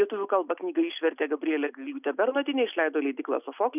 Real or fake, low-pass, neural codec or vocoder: real; 3.6 kHz; none